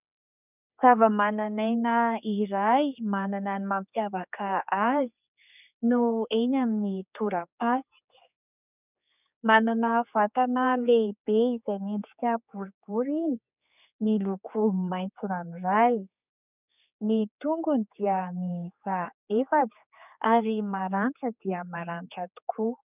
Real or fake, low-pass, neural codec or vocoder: fake; 3.6 kHz; codec, 16 kHz, 4 kbps, X-Codec, HuBERT features, trained on general audio